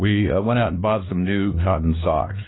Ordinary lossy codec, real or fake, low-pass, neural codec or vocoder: AAC, 16 kbps; fake; 7.2 kHz; codec, 16 kHz, 2 kbps, X-Codec, WavLM features, trained on Multilingual LibriSpeech